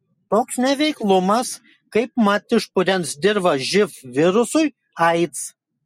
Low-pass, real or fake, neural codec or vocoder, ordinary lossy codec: 19.8 kHz; real; none; MP3, 64 kbps